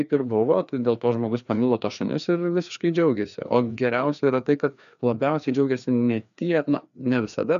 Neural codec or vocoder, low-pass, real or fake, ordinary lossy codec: codec, 16 kHz, 2 kbps, FreqCodec, larger model; 7.2 kHz; fake; MP3, 96 kbps